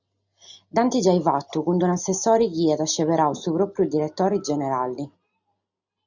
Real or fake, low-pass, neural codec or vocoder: real; 7.2 kHz; none